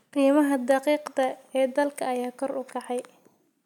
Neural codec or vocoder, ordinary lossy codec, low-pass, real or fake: none; none; 19.8 kHz; real